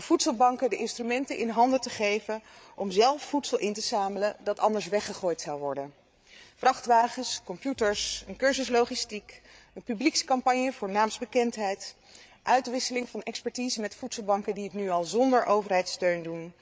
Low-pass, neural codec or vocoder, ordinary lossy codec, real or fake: none; codec, 16 kHz, 8 kbps, FreqCodec, larger model; none; fake